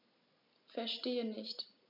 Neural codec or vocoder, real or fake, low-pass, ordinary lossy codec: none; real; 5.4 kHz; MP3, 48 kbps